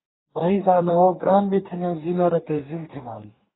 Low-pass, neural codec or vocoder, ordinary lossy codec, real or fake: 7.2 kHz; codec, 44.1 kHz, 2.6 kbps, DAC; AAC, 16 kbps; fake